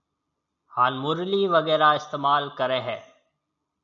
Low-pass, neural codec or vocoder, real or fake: 7.2 kHz; none; real